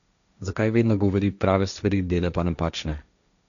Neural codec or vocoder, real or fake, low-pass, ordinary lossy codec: codec, 16 kHz, 1.1 kbps, Voila-Tokenizer; fake; 7.2 kHz; none